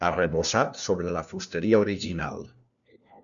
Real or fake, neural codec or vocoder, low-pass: fake; codec, 16 kHz, 1 kbps, FunCodec, trained on LibriTTS, 50 frames a second; 7.2 kHz